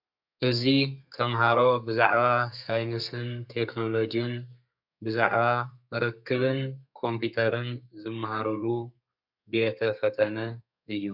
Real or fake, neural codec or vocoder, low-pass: fake; codec, 32 kHz, 1.9 kbps, SNAC; 5.4 kHz